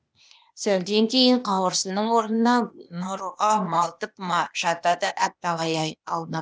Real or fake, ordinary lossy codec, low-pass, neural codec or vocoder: fake; none; none; codec, 16 kHz, 0.8 kbps, ZipCodec